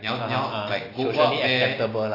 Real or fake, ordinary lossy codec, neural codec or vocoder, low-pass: real; AAC, 24 kbps; none; 5.4 kHz